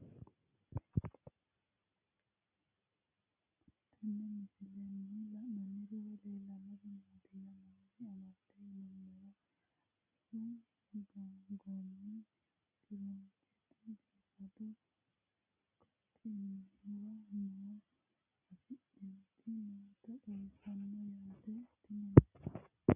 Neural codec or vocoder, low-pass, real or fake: none; 3.6 kHz; real